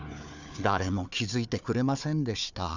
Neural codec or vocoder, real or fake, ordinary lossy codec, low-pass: codec, 16 kHz, 4 kbps, FunCodec, trained on Chinese and English, 50 frames a second; fake; none; 7.2 kHz